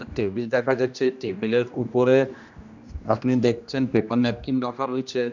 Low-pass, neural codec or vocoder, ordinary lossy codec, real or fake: 7.2 kHz; codec, 16 kHz, 1 kbps, X-Codec, HuBERT features, trained on general audio; none; fake